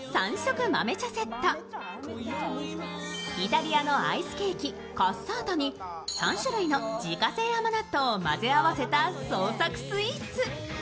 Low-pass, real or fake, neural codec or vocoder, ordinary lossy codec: none; real; none; none